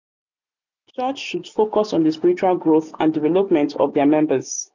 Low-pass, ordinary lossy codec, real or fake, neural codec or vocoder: 7.2 kHz; none; real; none